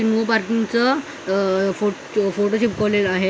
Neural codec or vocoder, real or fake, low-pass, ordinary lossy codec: none; real; none; none